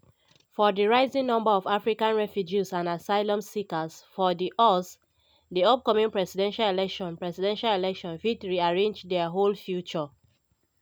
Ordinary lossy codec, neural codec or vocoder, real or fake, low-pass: none; none; real; 19.8 kHz